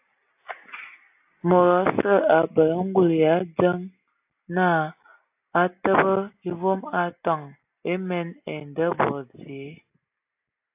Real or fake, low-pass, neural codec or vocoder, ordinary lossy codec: real; 3.6 kHz; none; AAC, 32 kbps